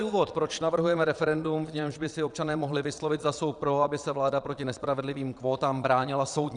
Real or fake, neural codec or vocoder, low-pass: fake; vocoder, 22.05 kHz, 80 mel bands, Vocos; 9.9 kHz